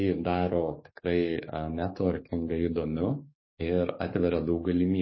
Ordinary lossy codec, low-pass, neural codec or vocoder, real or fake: MP3, 24 kbps; 7.2 kHz; codec, 44.1 kHz, 7.8 kbps, DAC; fake